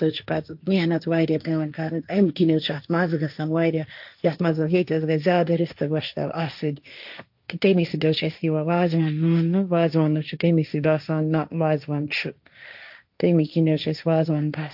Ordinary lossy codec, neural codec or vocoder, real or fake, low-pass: AAC, 48 kbps; codec, 16 kHz, 1.1 kbps, Voila-Tokenizer; fake; 5.4 kHz